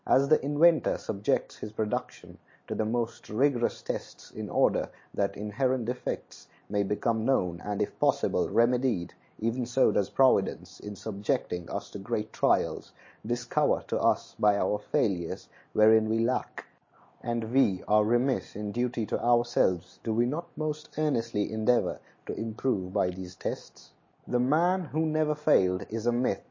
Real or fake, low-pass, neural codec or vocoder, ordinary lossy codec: real; 7.2 kHz; none; MP3, 32 kbps